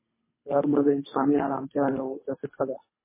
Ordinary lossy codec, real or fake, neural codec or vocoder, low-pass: MP3, 16 kbps; fake; codec, 24 kHz, 3 kbps, HILCodec; 3.6 kHz